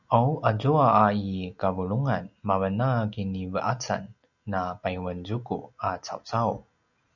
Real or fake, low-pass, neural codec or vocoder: real; 7.2 kHz; none